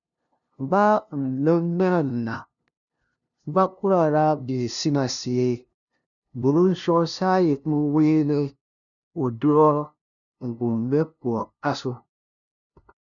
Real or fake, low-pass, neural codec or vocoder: fake; 7.2 kHz; codec, 16 kHz, 0.5 kbps, FunCodec, trained on LibriTTS, 25 frames a second